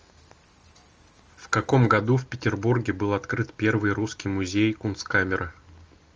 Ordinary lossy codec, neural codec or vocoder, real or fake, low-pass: Opus, 24 kbps; none; real; 7.2 kHz